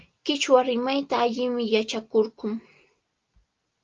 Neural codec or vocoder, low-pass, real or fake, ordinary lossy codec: none; 7.2 kHz; real; Opus, 32 kbps